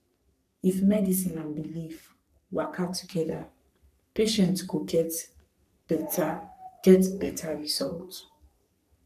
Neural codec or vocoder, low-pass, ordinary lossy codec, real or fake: codec, 44.1 kHz, 3.4 kbps, Pupu-Codec; 14.4 kHz; none; fake